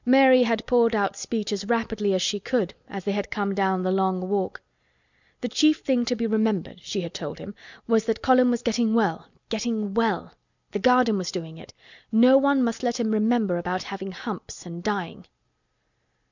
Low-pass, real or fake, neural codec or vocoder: 7.2 kHz; real; none